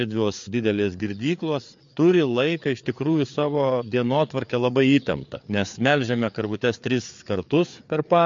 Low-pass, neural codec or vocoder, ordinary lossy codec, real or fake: 7.2 kHz; codec, 16 kHz, 4 kbps, FreqCodec, larger model; MP3, 48 kbps; fake